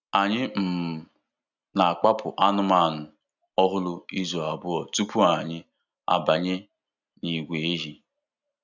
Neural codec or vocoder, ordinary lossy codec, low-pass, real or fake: none; none; 7.2 kHz; real